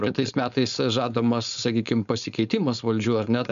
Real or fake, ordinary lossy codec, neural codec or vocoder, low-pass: fake; MP3, 96 kbps; codec, 16 kHz, 4.8 kbps, FACodec; 7.2 kHz